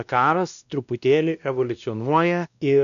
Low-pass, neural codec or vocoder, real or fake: 7.2 kHz; codec, 16 kHz, 1 kbps, X-Codec, WavLM features, trained on Multilingual LibriSpeech; fake